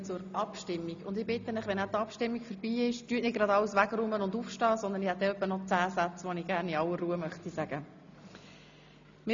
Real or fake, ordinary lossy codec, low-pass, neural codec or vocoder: real; MP3, 64 kbps; 7.2 kHz; none